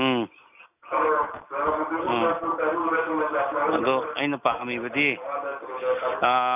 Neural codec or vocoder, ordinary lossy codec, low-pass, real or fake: none; none; 3.6 kHz; real